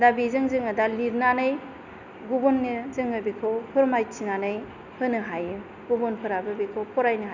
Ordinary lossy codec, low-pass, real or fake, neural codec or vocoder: none; 7.2 kHz; real; none